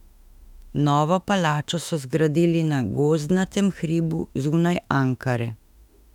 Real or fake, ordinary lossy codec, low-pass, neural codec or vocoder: fake; none; 19.8 kHz; autoencoder, 48 kHz, 32 numbers a frame, DAC-VAE, trained on Japanese speech